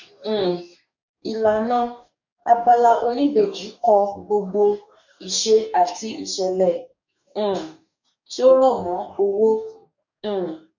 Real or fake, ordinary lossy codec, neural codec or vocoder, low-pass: fake; none; codec, 44.1 kHz, 2.6 kbps, DAC; 7.2 kHz